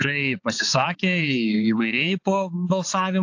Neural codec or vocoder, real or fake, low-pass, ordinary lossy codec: codec, 16 kHz, 4 kbps, X-Codec, HuBERT features, trained on balanced general audio; fake; 7.2 kHz; AAC, 48 kbps